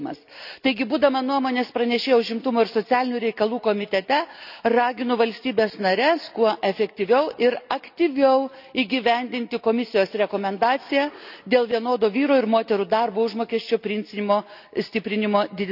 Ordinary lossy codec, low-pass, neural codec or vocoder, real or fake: none; 5.4 kHz; none; real